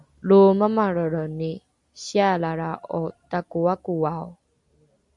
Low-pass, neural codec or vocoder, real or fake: 10.8 kHz; none; real